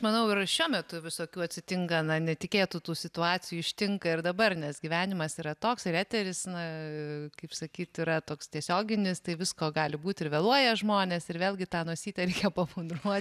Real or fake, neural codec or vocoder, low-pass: real; none; 14.4 kHz